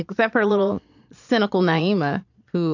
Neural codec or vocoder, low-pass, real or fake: vocoder, 22.05 kHz, 80 mel bands, Vocos; 7.2 kHz; fake